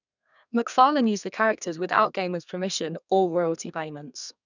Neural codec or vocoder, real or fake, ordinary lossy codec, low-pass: codec, 32 kHz, 1.9 kbps, SNAC; fake; none; 7.2 kHz